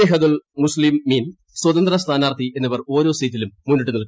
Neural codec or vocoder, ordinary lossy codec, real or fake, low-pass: none; none; real; none